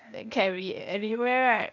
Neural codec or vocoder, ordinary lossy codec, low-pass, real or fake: codec, 16 kHz, 0.8 kbps, ZipCodec; none; 7.2 kHz; fake